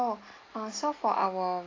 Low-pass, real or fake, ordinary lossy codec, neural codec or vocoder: 7.2 kHz; real; AAC, 32 kbps; none